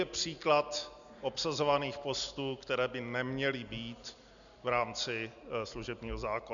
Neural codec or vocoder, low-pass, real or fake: none; 7.2 kHz; real